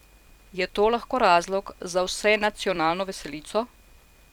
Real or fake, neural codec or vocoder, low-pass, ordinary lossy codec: fake; vocoder, 44.1 kHz, 128 mel bands every 256 samples, BigVGAN v2; 19.8 kHz; none